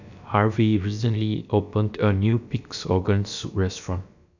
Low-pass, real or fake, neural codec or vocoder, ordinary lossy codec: 7.2 kHz; fake; codec, 16 kHz, about 1 kbps, DyCAST, with the encoder's durations; none